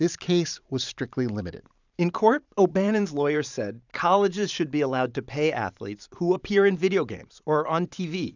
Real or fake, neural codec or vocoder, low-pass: fake; vocoder, 44.1 kHz, 128 mel bands every 512 samples, BigVGAN v2; 7.2 kHz